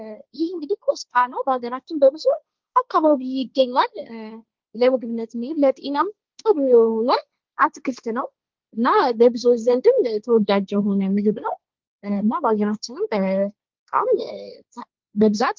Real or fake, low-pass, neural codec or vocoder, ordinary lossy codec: fake; 7.2 kHz; codec, 16 kHz, 1.1 kbps, Voila-Tokenizer; Opus, 32 kbps